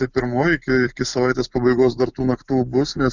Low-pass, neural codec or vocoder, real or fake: 7.2 kHz; none; real